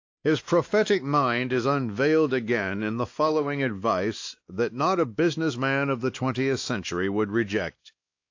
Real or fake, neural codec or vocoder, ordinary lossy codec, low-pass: fake; codec, 16 kHz, 2 kbps, X-Codec, WavLM features, trained on Multilingual LibriSpeech; AAC, 48 kbps; 7.2 kHz